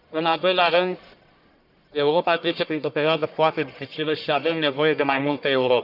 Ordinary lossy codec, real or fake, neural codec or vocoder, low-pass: none; fake; codec, 44.1 kHz, 1.7 kbps, Pupu-Codec; 5.4 kHz